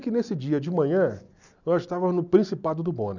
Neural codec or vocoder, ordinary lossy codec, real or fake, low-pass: none; none; real; 7.2 kHz